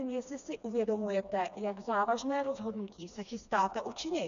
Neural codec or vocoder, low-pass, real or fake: codec, 16 kHz, 2 kbps, FreqCodec, smaller model; 7.2 kHz; fake